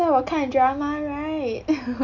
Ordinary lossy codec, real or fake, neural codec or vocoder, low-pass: none; real; none; 7.2 kHz